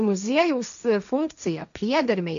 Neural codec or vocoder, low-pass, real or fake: codec, 16 kHz, 1.1 kbps, Voila-Tokenizer; 7.2 kHz; fake